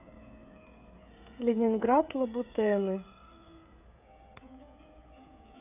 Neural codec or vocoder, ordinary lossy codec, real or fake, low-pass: codec, 16 kHz, 16 kbps, FreqCodec, smaller model; none; fake; 3.6 kHz